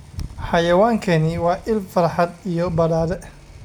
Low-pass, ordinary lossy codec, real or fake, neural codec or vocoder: 19.8 kHz; none; real; none